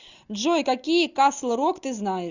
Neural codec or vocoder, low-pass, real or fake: none; 7.2 kHz; real